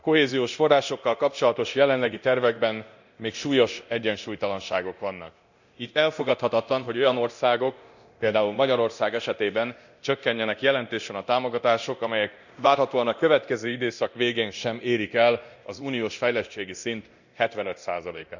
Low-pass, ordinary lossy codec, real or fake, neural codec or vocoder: 7.2 kHz; none; fake; codec, 24 kHz, 0.9 kbps, DualCodec